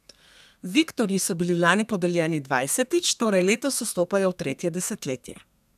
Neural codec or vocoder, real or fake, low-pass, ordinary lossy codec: codec, 32 kHz, 1.9 kbps, SNAC; fake; 14.4 kHz; none